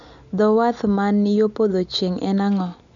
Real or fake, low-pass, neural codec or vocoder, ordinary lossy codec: real; 7.2 kHz; none; none